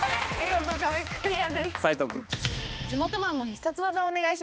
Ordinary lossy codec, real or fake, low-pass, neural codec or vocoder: none; fake; none; codec, 16 kHz, 2 kbps, X-Codec, HuBERT features, trained on balanced general audio